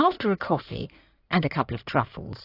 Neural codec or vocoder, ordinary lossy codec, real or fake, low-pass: codec, 16 kHz in and 24 kHz out, 2.2 kbps, FireRedTTS-2 codec; AAC, 32 kbps; fake; 5.4 kHz